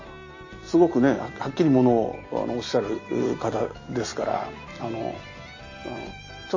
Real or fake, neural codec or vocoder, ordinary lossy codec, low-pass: real; none; MP3, 32 kbps; 7.2 kHz